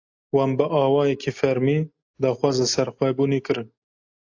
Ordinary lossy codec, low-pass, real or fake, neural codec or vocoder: AAC, 48 kbps; 7.2 kHz; real; none